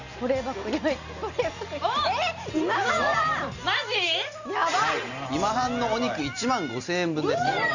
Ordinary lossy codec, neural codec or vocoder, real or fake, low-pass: none; none; real; 7.2 kHz